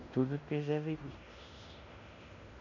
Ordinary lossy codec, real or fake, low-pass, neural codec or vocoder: none; fake; 7.2 kHz; codec, 16 kHz in and 24 kHz out, 0.9 kbps, LongCat-Audio-Codec, fine tuned four codebook decoder